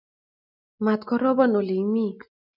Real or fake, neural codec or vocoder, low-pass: real; none; 5.4 kHz